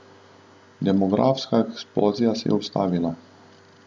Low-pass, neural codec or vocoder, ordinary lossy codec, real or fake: none; none; none; real